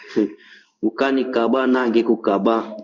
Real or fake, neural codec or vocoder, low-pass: fake; codec, 16 kHz in and 24 kHz out, 1 kbps, XY-Tokenizer; 7.2 kHz